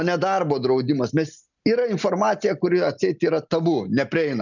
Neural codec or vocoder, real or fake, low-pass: none; real; 7.2 kHz